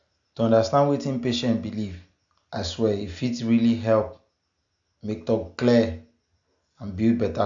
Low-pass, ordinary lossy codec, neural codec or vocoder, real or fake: 7.2 kHz; AAC, 64 kbps; none; real